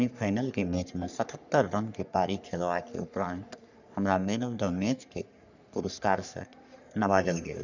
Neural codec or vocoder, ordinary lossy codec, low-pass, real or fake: codec, 44.1 kHz, 3.4 kbps, Pupu-Codec; none; 7.2 kHz; fake